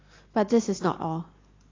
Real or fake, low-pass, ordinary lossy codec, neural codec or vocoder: real; 7.2 kHz; AAC, 32 kbps; none